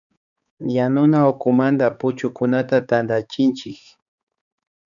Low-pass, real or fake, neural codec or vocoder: 7.2 kHz; fake; codec, 16 kHz, 4 kbps, X-Codec, HuBERT features, trained on balanced general audio